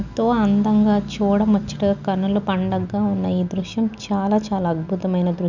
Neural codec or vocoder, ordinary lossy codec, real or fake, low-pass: none; none; real; 7.2 kHz